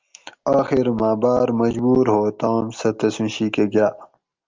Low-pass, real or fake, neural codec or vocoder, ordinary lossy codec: 7.2 kHz; real; none; Opus, 32 kbps